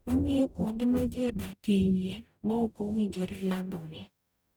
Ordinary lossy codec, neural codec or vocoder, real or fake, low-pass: none; codec, 44.1 kHz, 0.9 kbps, DAC; fake; none